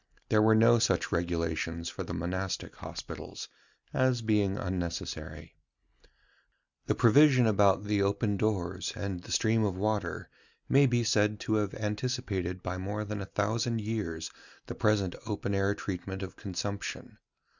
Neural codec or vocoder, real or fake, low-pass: none; real; 7.2 kHz